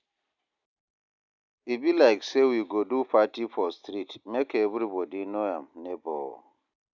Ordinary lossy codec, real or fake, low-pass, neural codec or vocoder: none; real; 7.2 kHz; none